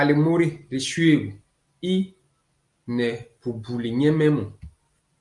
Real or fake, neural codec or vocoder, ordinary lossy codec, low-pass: real; none; Opus, 32 kbps; 10.8 kHz